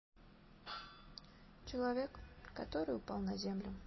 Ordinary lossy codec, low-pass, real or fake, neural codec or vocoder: MP3, 24 kbps; 7.2 kHz; real; none